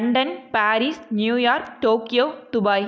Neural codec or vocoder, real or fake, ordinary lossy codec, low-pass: none; real; none; none